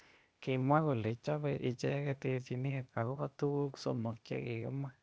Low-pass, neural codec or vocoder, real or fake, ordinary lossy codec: none; codec, 16 kHz, 0.8 kbps, ZipCodec; fake; none